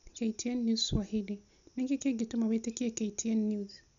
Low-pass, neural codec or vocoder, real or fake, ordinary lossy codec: 7.2 kHz; none; real; none